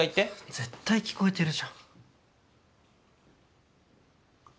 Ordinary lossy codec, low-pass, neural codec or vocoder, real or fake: none; none; none; real